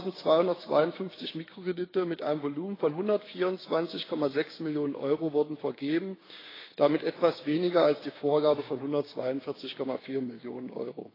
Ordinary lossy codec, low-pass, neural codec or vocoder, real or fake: AAC, 24 kbps; 5.4 kHz; codec, 16 kHz, 6 kbps, DAC; fake